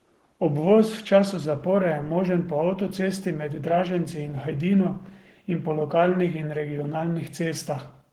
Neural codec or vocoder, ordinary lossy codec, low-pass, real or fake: codec, 44.1 kHz, 7.8 kbps, Pupu-Codec; Opus, 16 kbps; 19.8 kHz; fake